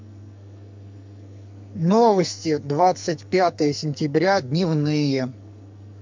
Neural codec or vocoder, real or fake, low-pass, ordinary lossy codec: codec, 44.1 kHz, 2.6 kbps, SNAC; fake; 7.2 kHz; MP3, 64 kbps